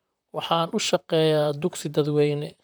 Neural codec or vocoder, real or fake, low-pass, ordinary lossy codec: vocoder, 44.1 kHz, 128 mel bands, Pupu-Vocoder; fake; none; none